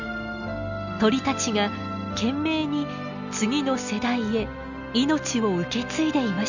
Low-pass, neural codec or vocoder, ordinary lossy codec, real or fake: 7.2 kHz; none; none; real